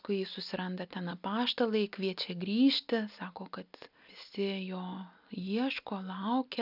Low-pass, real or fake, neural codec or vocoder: 5.4 kHz; real; none